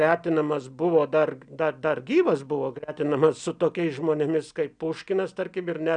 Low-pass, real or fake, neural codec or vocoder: 9.9 kHz; real; none